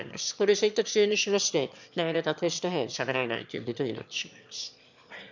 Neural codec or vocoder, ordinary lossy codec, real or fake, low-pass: autoencoder, 22.05 kHz, a latent of 192 numbers a frame, VITS, trained on one speaker; none; fake; 7.2 kHz